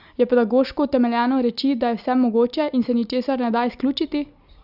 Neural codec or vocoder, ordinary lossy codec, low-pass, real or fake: none; Opus, 64 kbps; 5.4 kHz; real